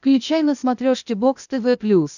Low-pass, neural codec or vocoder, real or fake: 7.2 kHz; codec, 16 kHz, 0.8 kbps, ZipCodec; fake